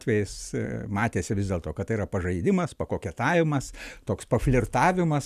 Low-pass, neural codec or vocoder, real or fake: 14.4 kHz; none; real